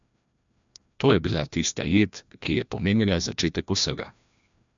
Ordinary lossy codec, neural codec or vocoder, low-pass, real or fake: MP3, 64 kbps; codec, 16 kHz, 1 kbps, FreqCodec, larger model; 7.2 kHz; fake